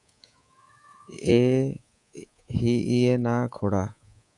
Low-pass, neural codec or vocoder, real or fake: 10.8 kHz; codec, 24 kHz, 3.1 kbps, DualCodec; fake